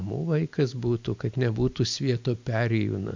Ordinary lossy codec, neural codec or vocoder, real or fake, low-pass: MP3, 64 kbps; none; real; 7.2 kHz